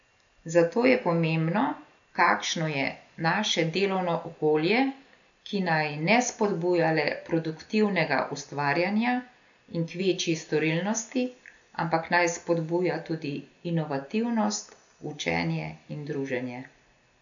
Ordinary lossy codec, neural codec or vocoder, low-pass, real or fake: none; none; 7.2 kHz; real